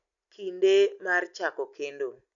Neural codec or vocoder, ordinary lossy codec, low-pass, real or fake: none; none; 7.2 kHz; real